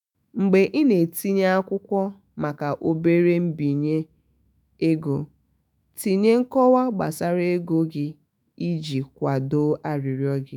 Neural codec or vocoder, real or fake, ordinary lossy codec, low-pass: autoencoder, 48 kHz, 128 numbers a frame, DAC-VAE, trained on Japanese speech; fake; none; 19.8 kHz